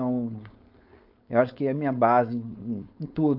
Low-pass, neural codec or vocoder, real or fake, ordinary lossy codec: 5.4 kHz; codec, 16 kHz, 4.8 kbps, FACodec; fake; AAC, 48 kbps